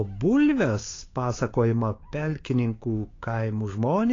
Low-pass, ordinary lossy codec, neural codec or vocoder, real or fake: 7.2 kHz; AAC, 32 kbps; codec, 16 kHz, 6 kbps, DAC; fake